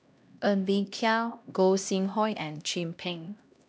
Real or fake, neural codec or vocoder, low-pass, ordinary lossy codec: fake; codec, 16 kHz, 1 kbps, X-Codec, HuBERT features, trained on LibriSpeech; none; none